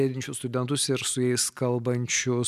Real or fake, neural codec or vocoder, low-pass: real; none; 14.4 kHz